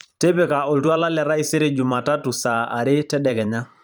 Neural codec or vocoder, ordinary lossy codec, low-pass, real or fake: vocoder, 44.1 kHz, 128 mel bands every 512 samples, BigVGAN v2; none; none; fake